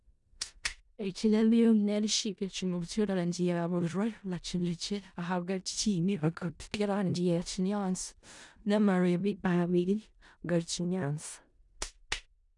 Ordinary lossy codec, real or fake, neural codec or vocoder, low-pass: none; fake; codec, 16 kHz in and 24 kHz out, 0.4 kbps, LongCat-Audio-Codec, four codebook decoder; 10.8 kHz